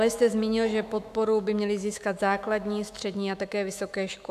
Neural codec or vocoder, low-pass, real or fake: autoencoder, 48 kHz, 128 numbers a frame, DAC-VAE, trained on Japanese speech; 14.4 kHz; fake